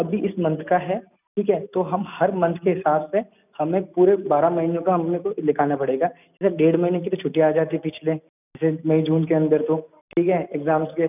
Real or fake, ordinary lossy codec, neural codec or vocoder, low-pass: real; none; none; 3.6 kHz